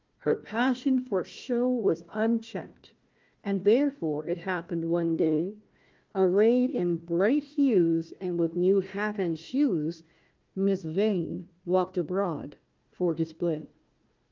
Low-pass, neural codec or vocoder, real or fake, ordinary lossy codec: 7.2 kHz; codec, 16 kHz, 1 kbps, FunCodec, trained on Chinese and English, 50 frames a second; fake; Opus, 24 kbps